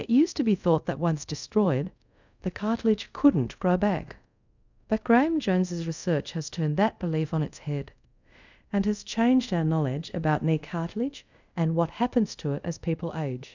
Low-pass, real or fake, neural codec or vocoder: 7.2 kHz; fake; codec, 24 kHz, 0.5 kbps, DualCodec